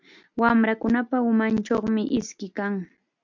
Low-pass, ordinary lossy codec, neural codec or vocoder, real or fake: 7.2 kHz; MP3, 64 kbps; none; real